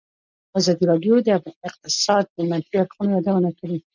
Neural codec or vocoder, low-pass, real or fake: none; 7.2 kHz; real